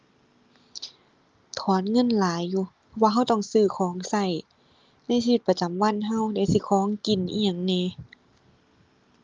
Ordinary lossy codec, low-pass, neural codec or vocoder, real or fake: Opus, 32 kbps; 7.2 kHz; none; real